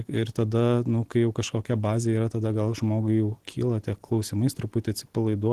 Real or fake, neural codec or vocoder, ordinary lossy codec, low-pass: real; none; Opus, 16 kbps; 14.4 kHz